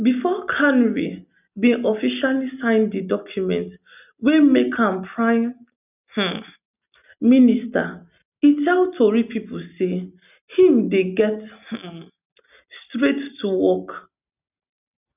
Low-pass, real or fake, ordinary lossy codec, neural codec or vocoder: 3.6 kHz; real; none; none